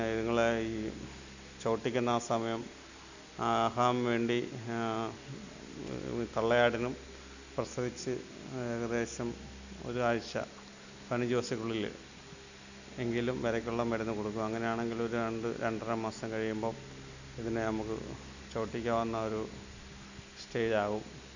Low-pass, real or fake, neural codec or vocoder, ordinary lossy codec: 7.2 kHz; real; none; none